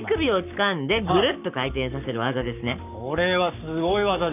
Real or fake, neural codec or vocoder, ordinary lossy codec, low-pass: fake; codec, 44.1 kHz, 7.8 kbps, Pupu-Codec; none; 3.6 kHz